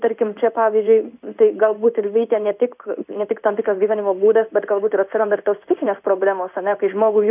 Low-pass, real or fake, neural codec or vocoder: 3.6 kHz; fake; codec, 16 kHz in and 24 kHz out, 1 kbps, XY-Tokenizer